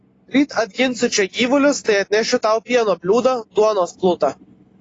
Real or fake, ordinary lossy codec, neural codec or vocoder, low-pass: real; AAC, 32 kbps; none; 10.8 kHz